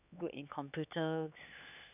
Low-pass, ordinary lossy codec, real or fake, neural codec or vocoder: 3.6 kHz; none; fake; codec, 16 kHz, 4 kbps, X-Codec, HuBERT features, trained on LibriSpeech